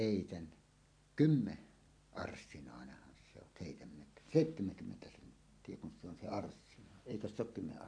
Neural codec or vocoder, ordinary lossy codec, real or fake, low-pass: none; AAC, 48 kbps; real; 9.9 kHz